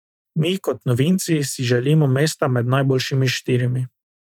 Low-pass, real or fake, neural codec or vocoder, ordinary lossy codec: 19.8 kHz; fake; vocoder, 44.1 kHz, 128 mel bands every 512 samples, BigVGAN v2; none